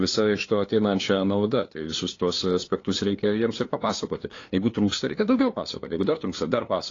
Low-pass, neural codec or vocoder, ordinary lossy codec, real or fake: 7.2 kHz; codec, 16 kHz, 2 kbps, FunCodec, trained on LibriTTS, 25 frames a second; AAC, 32 kbps; fake